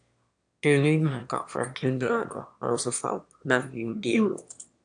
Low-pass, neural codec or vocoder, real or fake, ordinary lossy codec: 9.9 kHz; autoencoder, 22.05 kHz, a latent of 192 numbers a frame, VITS, trained on one speaker; fake; AAC, 64 kbps